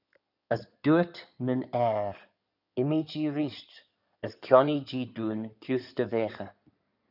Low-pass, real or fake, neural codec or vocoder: 5.4 kHz; fake; codec, 16 kHz in and 24 kHz out, 2.2 kbps, FireRedTTS-2 codec